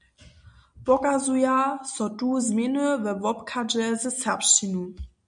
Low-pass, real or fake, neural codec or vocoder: 9.9 kHz; real; none